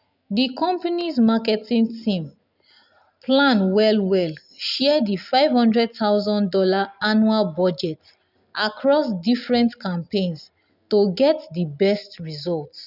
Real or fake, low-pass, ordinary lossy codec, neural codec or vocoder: real; 5.4 kHz; none; none